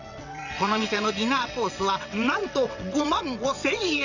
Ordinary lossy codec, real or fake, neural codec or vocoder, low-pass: none; fake; vocoder, 22.05 kHz, 80 mel bands, WaveNeXt; 7.2 kHz